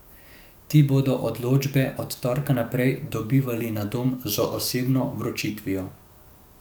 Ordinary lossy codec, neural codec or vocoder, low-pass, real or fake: none; codec, 44.1 kHz, 7.8 kbps, DAC; none; fake